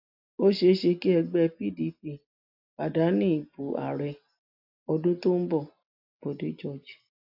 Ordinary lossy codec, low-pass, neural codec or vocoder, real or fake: AAC, 48 kbps; 5.4 kHz; none; real